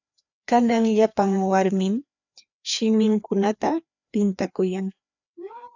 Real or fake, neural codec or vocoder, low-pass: fake; codec, 16 kHz, 2 kbps, FreqCodec, larger model; 7.2 kHz